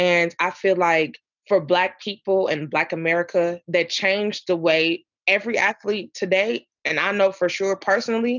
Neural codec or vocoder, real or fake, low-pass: none; real; 7.2 kHz